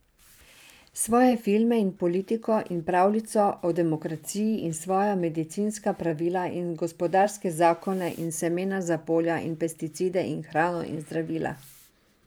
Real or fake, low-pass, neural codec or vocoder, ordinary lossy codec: fake; none; vocoder, 44.1 kHz, 128 mel bands every 512 samples, BigVGAN v2; none